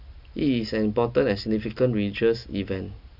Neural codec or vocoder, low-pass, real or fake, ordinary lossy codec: none; 5.4 kHz; real; none